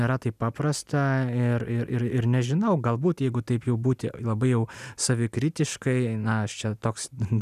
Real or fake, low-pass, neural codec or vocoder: real; 14.4 kHz; none